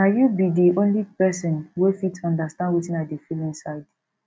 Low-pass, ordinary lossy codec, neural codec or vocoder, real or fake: none; none; none; real